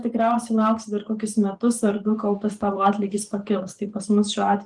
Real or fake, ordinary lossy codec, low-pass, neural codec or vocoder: fake; Opus, 24 kbps; 10.8 kHz; vocoder, 44.1 kHz, 128 mel bands every 512 samples, BigVGAN v2